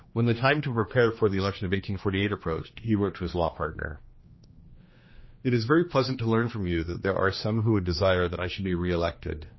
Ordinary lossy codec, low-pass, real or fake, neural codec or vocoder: MP3, 24 kbps; 7.2 kHz; fake; codec, 16 kHz, 2 kbps, X-Codec, HuBERT features, trained on general audio